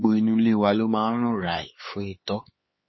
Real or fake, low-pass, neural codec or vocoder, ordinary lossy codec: fake; 7.2 kHz; codec, 16 kHz, 4 kbps, X-Codec, HuBERT features, trained on LibriSpeech; MP3, 24 kbps